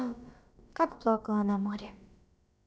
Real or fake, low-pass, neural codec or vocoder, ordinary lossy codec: fake; none; codec, 16 kHz, about 1 kbps, DyCAST, with the encoder's durations; none